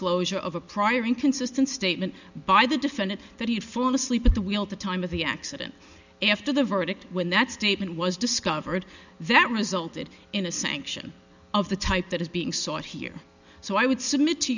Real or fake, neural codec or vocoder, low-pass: real; none; 7.2 kHz